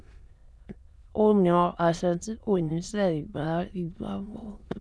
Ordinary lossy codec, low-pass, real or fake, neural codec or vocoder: none; none; fake; autoencoder, 22.05 kHz, a latent of 192 numbers a frame, VITS, trained on many speakers